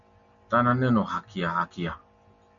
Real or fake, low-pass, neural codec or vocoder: real; 7.2 kHz; none